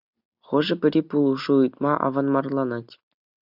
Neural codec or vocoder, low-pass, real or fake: none; 5.4 kHz; real